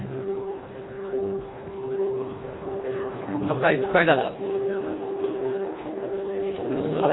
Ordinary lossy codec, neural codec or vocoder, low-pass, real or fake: AAC, 16 kbps; codec, 24 kHz, 1.5 kbps, HILCodec; 7.2 kHz; fake